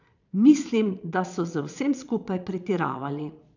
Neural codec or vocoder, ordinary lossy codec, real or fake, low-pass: vocoder, 22.05 kHz, 80 mel bands, Vocos; none; fake; 7.2 kHz